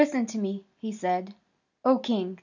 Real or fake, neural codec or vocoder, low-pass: real; none; 7.2 kHz